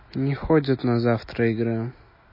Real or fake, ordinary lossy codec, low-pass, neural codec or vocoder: real; MP3, 24 kbps; 5.4 kHz; none